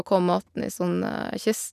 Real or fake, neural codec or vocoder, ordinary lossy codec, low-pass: real; none; none; 14.4 kHz